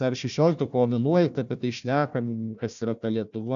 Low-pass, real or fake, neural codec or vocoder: 7.2 kHz; fake; codec, 16 kHz, 1 kbps, FunCodec, trained on Chinese and English, 50 frames a second